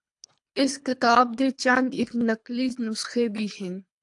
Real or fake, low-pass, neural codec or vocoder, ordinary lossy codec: fake; 10.8 kHz; codec, 24 kHz, 3 kbps, HILCodec; AAC, 64 kbps